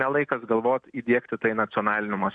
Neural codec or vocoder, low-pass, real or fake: none; 9.9 kHz; real